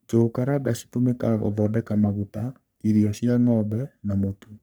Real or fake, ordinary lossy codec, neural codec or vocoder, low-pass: fake; none; codec, 44.1 kHz, 3.4 kbps, Pupu-Codec; none